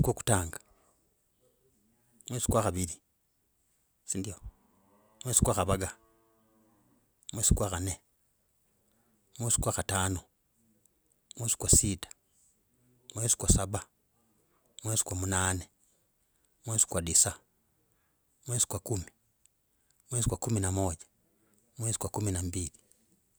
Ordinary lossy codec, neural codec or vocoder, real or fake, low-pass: none; none; real; none